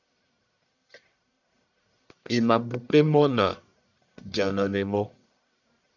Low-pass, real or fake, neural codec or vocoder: 7.2 kHz; fake; codec, 44.1 kHz, 1.7 kbps, Pupu-Codec